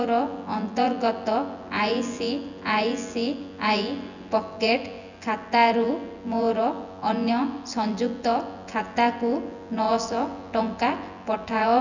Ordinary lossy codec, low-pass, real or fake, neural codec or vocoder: none; 7.2 kHz; fake; vocoder, 24 kHz, 100 mel bands, Vocos